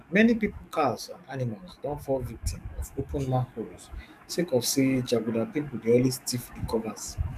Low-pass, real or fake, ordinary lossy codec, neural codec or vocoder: 14.4 kHz; fake; none; codec, 44.1 kHz, 7.8 kbps, DAC